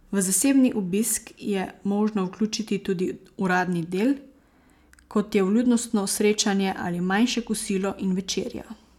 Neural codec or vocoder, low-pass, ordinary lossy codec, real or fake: none; 19.8 kHz; none; real